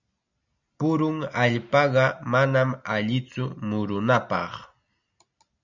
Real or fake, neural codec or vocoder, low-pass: real; none; 7.2 kHz